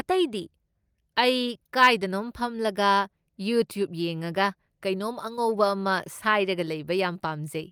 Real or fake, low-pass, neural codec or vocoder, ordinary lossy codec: real; 19.8 kHz; none; Opus, 32 kbps